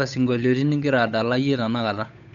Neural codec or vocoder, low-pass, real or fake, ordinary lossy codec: codec, 16 kHz, 8 kbps, FunCodec, trained on Chinese and English, 25 frames a second; 7.2 kHz; fake; none